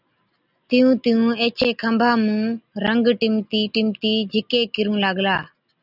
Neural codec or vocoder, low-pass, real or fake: none; 5.4 kHz; real